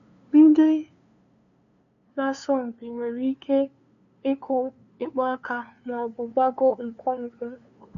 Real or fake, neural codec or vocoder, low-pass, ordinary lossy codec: fake; codec, 16 kHz, 2 kbps, FunCodec, trained on LibriTTS, 25 frames a second; 7.2 kHz; AAC, 96 kbps